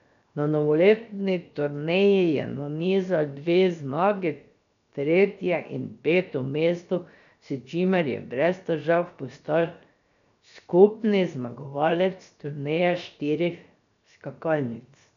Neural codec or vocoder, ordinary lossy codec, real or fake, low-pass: codec, 16 kHz, 0.7 kbps, FocalCodec; none; fake; 7.2 kHz